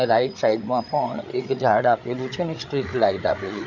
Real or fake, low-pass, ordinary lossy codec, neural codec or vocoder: fake; 7.2 kHz; none; codec, 16 kHz, 8 kbps, FreqCodec, larger model